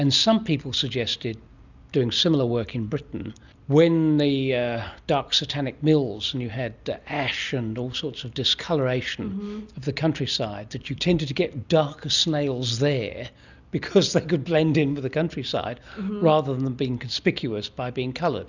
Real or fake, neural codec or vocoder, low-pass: real; none; 7.2 kHz